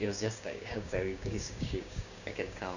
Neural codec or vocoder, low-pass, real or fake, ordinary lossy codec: codec, 16 kHz, 6 kbps, DAC; 7.2 kHz; fake; none